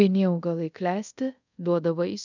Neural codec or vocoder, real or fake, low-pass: codec, 24 kHz, 0.5 kbps, DualCodec; fake; 7.2 kHz